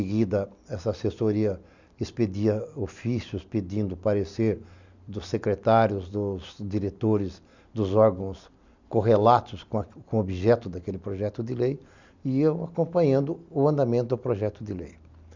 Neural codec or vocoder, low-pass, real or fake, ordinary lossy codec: none; 7.2 kHz; real; none